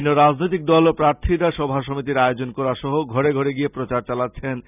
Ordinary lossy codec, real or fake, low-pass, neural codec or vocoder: none; real; 3.6 kHz; none